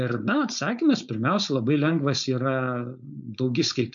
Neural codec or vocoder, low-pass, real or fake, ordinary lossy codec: codec, 16 kHz, 4.8 kbps, FACodec; 7.2 kHz; fake; MP3, 96 kbps